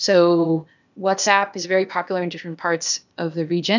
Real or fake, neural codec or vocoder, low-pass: fake; codec, 16 kHz, 0.8 kbps, ZipCodec; 7.2 kHz